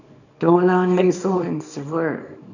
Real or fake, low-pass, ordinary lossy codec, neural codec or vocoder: fake; 7.2 kHz; none; codec, 24 kHz, 0.9 kbps, WavTokenizer, small release